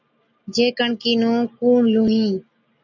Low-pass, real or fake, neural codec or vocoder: 7.2 kHz; real; none